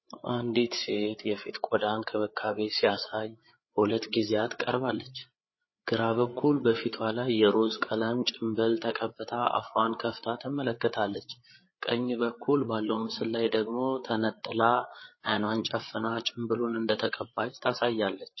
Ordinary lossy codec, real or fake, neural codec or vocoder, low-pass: MP3, 24 kbps; fake; codec, 16 kHz, 8 kbps, FreqCodec, larger model; 7.2 kHz